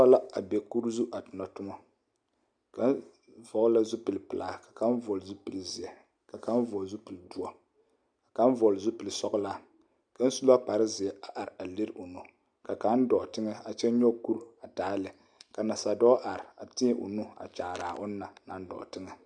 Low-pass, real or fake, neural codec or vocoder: 9.9 kHz; real; none